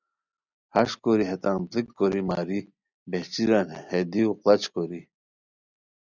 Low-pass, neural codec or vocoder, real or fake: 7.2 kHz; none; real